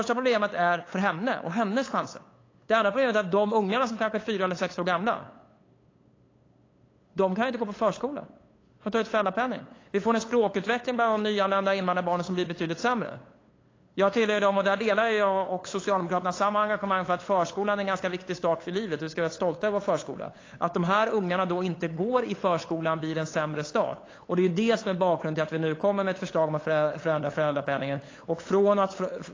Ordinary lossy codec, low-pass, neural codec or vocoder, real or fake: AAC, 32 kbps; 7.2 kHz; codec, 16 kHz, 8 kbps, FunCodec, trained on LibriTTS, 25 frames a second; fake